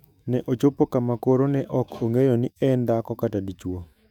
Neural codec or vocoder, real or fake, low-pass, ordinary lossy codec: autoencoder, 48 kHz, 128 numbers a frame, DAC-VAE, trained on Japanese speech; fake; 19.8 kHz; none